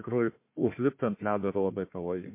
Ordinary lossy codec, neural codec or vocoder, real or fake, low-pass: MP3, 24 kbps; codec, 16 kHz, 1 kbps, FunCodec, trained on Chinese and English, 50 frames a second; fake; 3.6 kHz